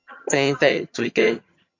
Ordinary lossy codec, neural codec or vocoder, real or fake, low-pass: MP3, 48 kbps; vocoder, 22.05 kHz, 80 mel bands, HiFi-GAN; fake; 7.2 kHz